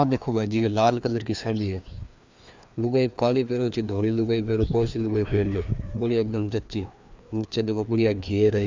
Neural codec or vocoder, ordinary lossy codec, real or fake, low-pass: codec, 16 kHz, 2 kbps, FreqCodec, larger model; MP3, 64 kbps; fake; 7.2 kHz